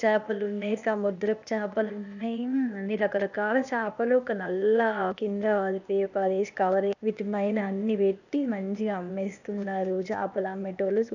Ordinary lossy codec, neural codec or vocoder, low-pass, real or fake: none; codec, 16 kHz, 0.8 kbps, ZipCodec; 7.2 kHz; fake